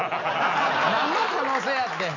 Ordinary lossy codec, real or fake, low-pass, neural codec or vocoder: none; real; 7.2 kHz; none